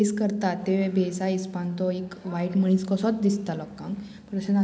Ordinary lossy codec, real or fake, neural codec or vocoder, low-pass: none; real; none; none